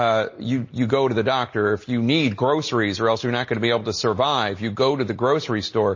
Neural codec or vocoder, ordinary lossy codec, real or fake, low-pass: none; MP3, 32 kbps; real; 7.2 kHz